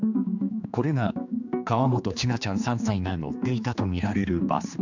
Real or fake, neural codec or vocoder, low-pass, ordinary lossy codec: fake; codec, 16 kHz, 2 kbps, X-Codec, HuBERT features, trained on general audio; 7.2 kHz; none